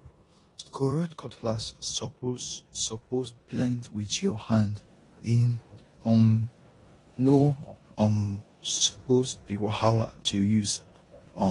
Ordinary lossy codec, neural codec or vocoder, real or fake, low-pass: AAC, 32 kbps; codec, 16 kHz in and 24 kHz out, 0.9 kbps, LongCat-Audio-Codec, four codebook decoder; fake; 10.8 kHz